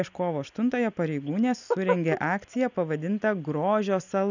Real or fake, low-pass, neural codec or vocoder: real; 7.2 kHz; none